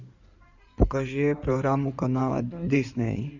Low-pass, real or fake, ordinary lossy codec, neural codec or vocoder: 7.2 kHz; fake; none; codec, 16 kHz in and 24 kHz out, 2.2 kbps, FireRedTTS-2 codec